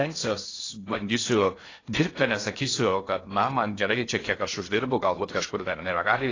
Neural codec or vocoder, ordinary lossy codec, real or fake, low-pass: codec, 16 kHz in and 24 kHz out, 0.6 kbps, FocalCodec, streaming, 4096 codes; AAC, 32 kbps; fake; 7.2 kHz